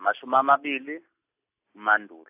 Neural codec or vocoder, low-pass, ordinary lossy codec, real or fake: none; 3.6 kHz; AAC, 32 kbps; real